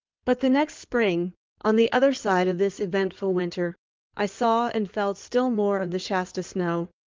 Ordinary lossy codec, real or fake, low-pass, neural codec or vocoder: Opus, 32 kbps; fake; 7.2 kHz; codec, 16 kHz in and 24 kHz out, 2.2 kbps, FireRedTTS-2 codec